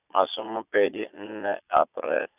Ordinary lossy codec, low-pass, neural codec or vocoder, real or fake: none; 3.6 kHz; vocoder, 22.05 kHz, 80 mel bands, Vocos; fake